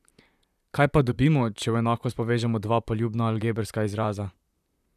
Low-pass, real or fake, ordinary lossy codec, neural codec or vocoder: 14.4 kHz; fake; none; vocoder, 44.1 kHz, 128 mel bands, Pupu-Vocoder